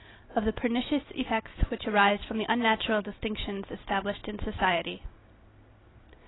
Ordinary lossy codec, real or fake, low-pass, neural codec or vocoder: AAC, 16 kbps; real; 7.2 kHz; none